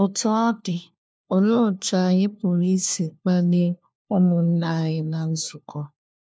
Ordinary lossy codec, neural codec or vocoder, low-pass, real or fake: none; codec, 16 kHz, 1 kbps, FunCodec, trained on LibriTTS, 50 frames a second; none; fake